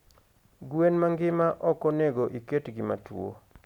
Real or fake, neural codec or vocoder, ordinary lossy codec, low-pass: real; none; none; 19.8 kHz